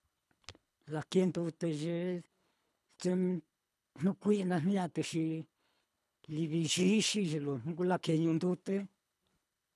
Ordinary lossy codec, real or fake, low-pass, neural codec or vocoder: none; fake; none; codec, 24 kHz, 3 kbps, HILCodec